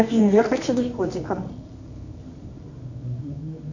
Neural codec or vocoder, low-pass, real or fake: codec, 24 kHz, 0.9 kbps, WavTokenizer, medium music audio release; 7.2 kHz; fake